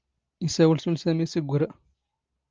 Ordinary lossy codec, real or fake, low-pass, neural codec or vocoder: Opus, 24 kbps; real; 7.2 kHz; none